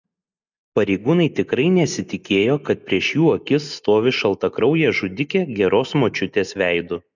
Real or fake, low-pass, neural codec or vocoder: real; 7.2 kHz; none